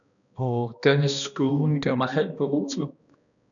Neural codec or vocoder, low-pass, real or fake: codec, 16 kHz, 1 kbps, X-Codec, HuBERT features, trained on balanced general audio; 7.2 kHz; fake